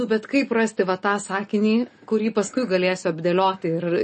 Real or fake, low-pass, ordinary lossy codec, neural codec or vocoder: real; 10.8 kHz; MP3, 32 kbps; none